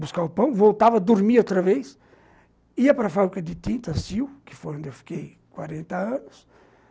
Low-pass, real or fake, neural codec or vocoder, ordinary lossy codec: none; real; none; none